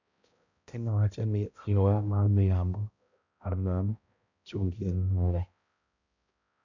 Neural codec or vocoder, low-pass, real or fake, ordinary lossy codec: codec, 16 kHz, 0.5 kbps, X-Codec, HuBERT features, trained on balanced general audio; 7.2 kHz; fake; none